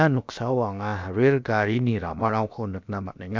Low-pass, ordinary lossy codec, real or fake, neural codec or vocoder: 7.2 kHz; MP3, 64 kbps; fake; codec, 16 kHz, about 1 kbps, DyCAST, with the encoder's durations